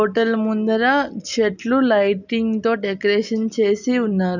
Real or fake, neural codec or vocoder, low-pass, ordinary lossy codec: real; none; 7.2 kHz; none